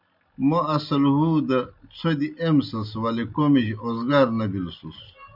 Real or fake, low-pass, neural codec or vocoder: real; 5.4 kHz; none